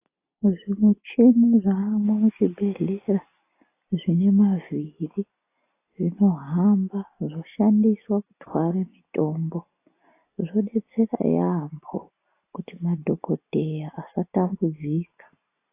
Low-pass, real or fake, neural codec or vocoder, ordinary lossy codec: 3.6 kHz; real; none; AAC, 24 kbps